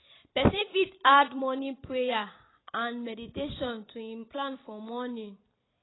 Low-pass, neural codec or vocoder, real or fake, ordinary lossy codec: 7.2 kHz; vocoder, 44.1 kHz, 128 mel bands every 256 samples, BigVGAN v2; fake; AAC, 16 kbps